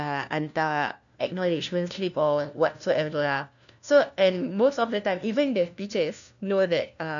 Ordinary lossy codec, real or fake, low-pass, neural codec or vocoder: none; fake; 7.2 kHz; codec, 16 kHz, 1 kbps, FunCodec, trained on LibriTTS, 50 frames a second